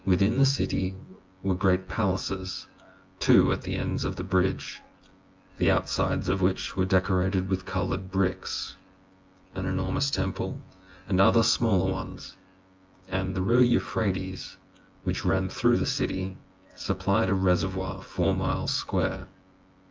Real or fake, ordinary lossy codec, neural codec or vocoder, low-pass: fake; Opus, 32 kbps; vocoder, 24 kHz, 100 mel bands, Vocos; 7.2 kHz